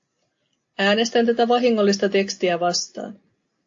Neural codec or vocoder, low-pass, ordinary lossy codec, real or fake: none; 7.2 kHz; AAC, 48 kbps; real